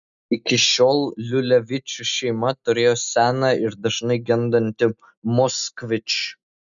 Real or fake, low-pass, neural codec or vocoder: real; 7.2 kHz; none